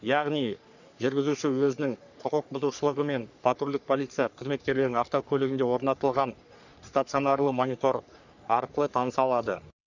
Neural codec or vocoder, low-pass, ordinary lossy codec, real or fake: codec, 44.1 kHz, 3.4 kbps, Pupu-Codec; 7.2 kHz; none; fake